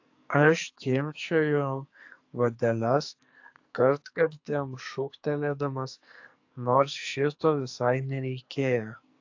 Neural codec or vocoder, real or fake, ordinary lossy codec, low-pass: codec, 44.1 kHz, 2.6 kbps, SNAC; fake; AAC, 48 kbps; 7.2 kHz